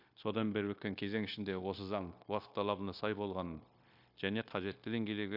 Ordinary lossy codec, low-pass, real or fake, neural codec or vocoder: MP3, 48 kbps; 5.4 kHz; fake; codec, 16 kHz, 0.9 kbps, LongCat-Audio-Codec